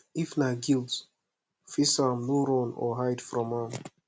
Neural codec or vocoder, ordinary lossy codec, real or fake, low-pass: none; none; real; none